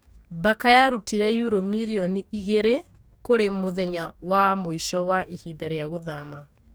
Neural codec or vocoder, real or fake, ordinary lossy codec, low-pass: codec, 44.1 kHz, 2.6 kbps, DAC; fake; none; none